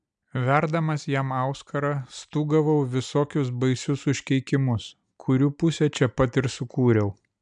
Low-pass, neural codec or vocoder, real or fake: 9.9 kHz; none; real